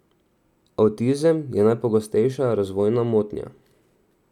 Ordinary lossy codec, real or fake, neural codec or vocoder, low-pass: none; real; none; 19.8 kHz